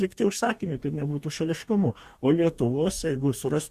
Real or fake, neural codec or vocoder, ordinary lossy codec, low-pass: fake; codec, 44.1 kHz, 2.6 kbps, DAC; Opus, 64 kbps; 14.4 kHz